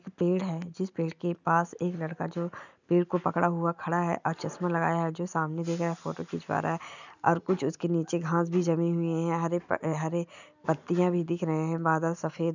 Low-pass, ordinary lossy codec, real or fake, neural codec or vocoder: 7.2 kHz; none; real; none